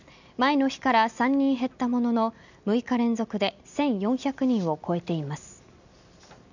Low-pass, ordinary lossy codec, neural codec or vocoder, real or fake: 7.2 kHz; none; none; real